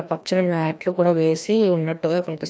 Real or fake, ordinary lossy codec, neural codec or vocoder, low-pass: fake; none; codec, 16 kHz, 1 kbps, FreqCodec, larger model; none